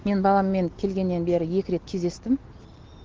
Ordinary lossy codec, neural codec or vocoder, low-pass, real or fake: Opus, 16 kbps; none; 7.2 kHz; real